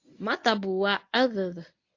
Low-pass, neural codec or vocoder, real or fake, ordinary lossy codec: 7.2 kHz; codec, 24 kHz, 0.9 kbps, WavTokenizer, medium speech release version 1; fake; AAC, 48 kbps